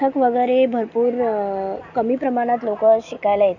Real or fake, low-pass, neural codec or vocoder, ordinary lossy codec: real; 7.2 kHz; none; none